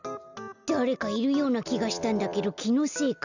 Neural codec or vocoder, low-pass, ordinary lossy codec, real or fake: none; 7.2 kHz; none; real